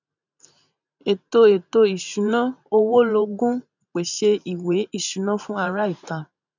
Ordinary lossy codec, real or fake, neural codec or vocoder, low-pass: none; fake; vocoder, 44.1 kHz, 128 mel bands every 512 samples, BigVGAN v2; 7.2 kHz